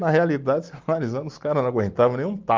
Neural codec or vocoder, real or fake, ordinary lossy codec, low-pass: none; real; Opus, 24 kbps; 7.2 kHz